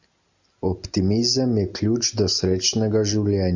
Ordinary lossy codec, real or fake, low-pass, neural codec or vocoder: MP3, 64 kbps; real; 7.2 kHz; none